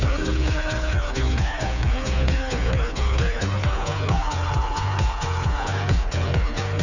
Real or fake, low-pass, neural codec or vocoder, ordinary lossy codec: fake; 7.2 kHz; codec, 16 kHz, 4 kbps, FreqCodec, smaller model; none